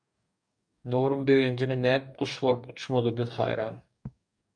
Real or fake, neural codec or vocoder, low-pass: fake; codec, 44.1 kHz, 2.6 kbps, DAC; 9.9 kHz